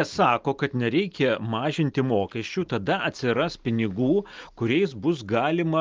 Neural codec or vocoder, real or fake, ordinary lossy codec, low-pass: none; real; Opus, 24 kbps; 7.2 kHz